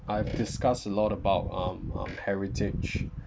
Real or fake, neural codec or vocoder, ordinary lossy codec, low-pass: real; none; none; none